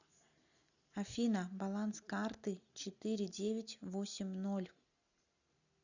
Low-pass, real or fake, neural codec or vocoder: 7.2 kHz; real; none